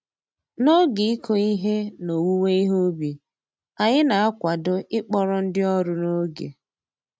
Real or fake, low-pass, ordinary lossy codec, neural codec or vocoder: real; none; none; none